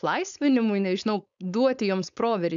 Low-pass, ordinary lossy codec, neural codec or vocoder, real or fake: 7.2 kHz; MP3, 96 kbps; codec, 16 kHz, 4.8 kbps, FACodec; fake